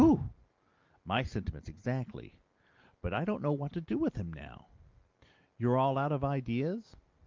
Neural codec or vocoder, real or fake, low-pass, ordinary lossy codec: none; real; 7.2 kHz; Opus, 32 kbps